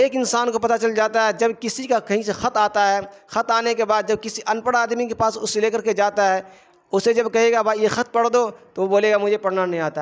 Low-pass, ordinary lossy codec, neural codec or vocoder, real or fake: none; none; none; real